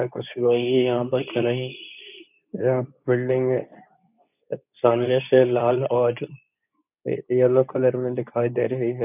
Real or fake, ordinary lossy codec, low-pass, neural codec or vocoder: fake; none; 3.6 kHz; codec, 24 kHz, 0.9 kbps, WavTokenizer, medium speech release version 2